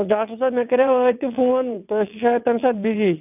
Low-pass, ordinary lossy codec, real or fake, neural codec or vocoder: 3.6 kHz; none; fake; vocoder, 22.05 kHz, 80 mel bands, WaveNeXt